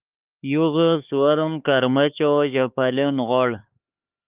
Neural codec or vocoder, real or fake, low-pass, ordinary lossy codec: codec, 16 kHz, 4 kbps, X-Codec, HuBERT features, trained on LibriSpeech; fake; 3.6 kHz; Opus, 32 kbps